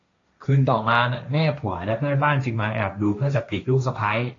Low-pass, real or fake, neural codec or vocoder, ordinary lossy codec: 7.2 kHz; fake; codec, 16 kHz, 1.1 kbps, Voila-Tokenizer; AAC, 32 kbps